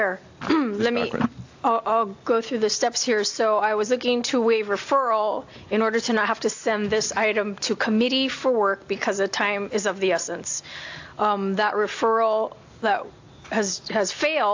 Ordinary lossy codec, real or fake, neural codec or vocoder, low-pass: AAC, 48 kbps; real; none; 7.2 kHz